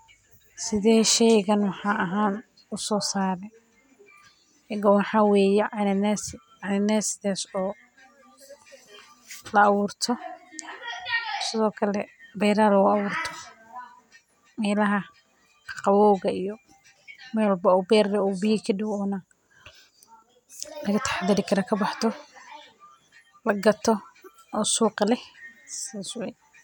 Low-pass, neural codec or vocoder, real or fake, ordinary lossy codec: 19.8 kHz; none; real; none